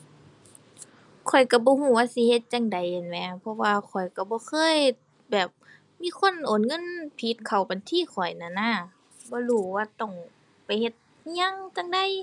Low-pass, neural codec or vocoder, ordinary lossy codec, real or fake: 10.8 kHz; none; none; real